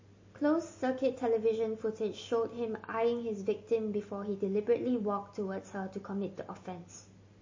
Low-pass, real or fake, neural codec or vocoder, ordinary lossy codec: 7.2 kHz; real; none; MP3, 32 kbps